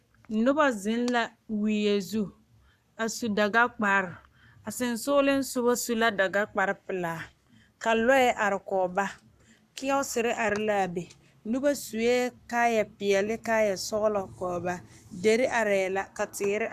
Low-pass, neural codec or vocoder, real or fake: 14.4 kHz; codec, 44.1 kHz, 7.8 kbps, Pupu-Codec; fake